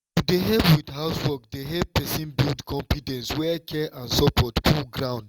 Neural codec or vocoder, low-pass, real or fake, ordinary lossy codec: none; 19.8 kHz; real; Opus, 32 kbps